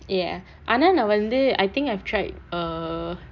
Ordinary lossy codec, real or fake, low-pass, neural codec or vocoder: none; real; 7.2 kHz; none